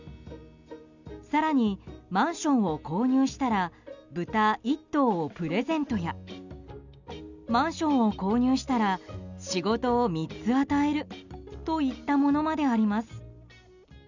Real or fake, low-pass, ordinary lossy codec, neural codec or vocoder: real; 7.2 kHz; none; none